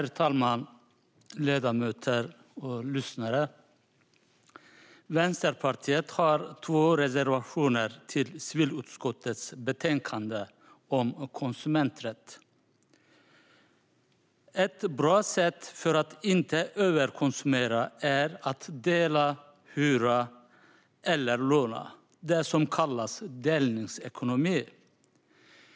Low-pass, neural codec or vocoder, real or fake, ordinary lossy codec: none; none; real; none